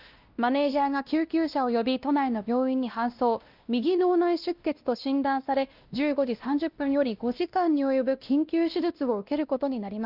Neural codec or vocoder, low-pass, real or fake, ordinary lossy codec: codec, 16 kHz, 1 kbps, X-Codec, WavLM features, trained on Multilingual LibriSpeech; 5.4 kHz; fake; Opus, 24 kbps